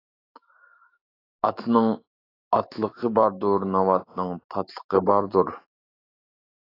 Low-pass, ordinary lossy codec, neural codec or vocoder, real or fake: 5.4 kHz; AAC, 24 kbps; autoencoder, 48 kHz, 128 numbers a frame, DAC-VAE, trained on Japanese speech; fake